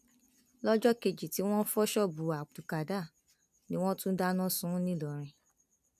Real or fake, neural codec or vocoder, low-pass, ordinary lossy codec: real; none; 14.4 kHz; none